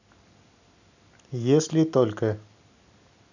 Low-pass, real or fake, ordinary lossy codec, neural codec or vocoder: 7.2 kHz; real; none; none